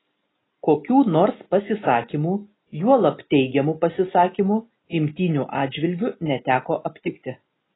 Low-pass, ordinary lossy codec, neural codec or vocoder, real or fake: 7.2 kHz; AAC, 16 kbps; none; real